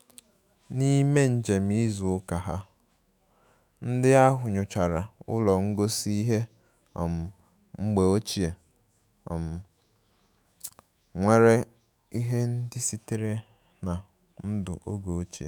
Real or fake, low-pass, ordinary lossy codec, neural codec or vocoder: fake; none; none; autoencoder, 48 kHz, 128 numbers a frame, DAC-VAE, trained on Japanese speech